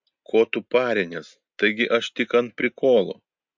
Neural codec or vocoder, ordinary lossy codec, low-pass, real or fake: none; MP3, 64 kbps; 7.2 kHz; real